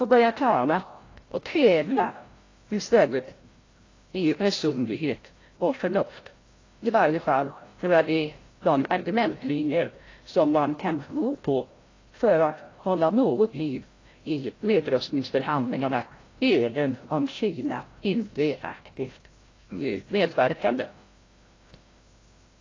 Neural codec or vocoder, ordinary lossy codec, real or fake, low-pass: codec, 16 kHz, 0.5 kbps, FreqCodec, larger model; AAC, 32 kbps; fake; 7.2 kHz